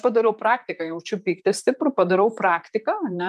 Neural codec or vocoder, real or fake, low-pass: vocoder, 44.1 kHz, 128 mel bands, Pupu-Vocoder; fake; 14.4 kHz